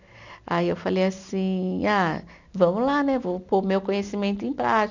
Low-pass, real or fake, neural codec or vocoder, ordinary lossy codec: 7.2 kHz; real; none; none